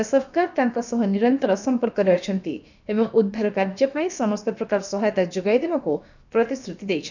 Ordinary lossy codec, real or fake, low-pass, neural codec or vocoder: none; fake; 7.2 kHz; codec, 16 kHz, about 1 kbps, DyCAST, with the encoder's durations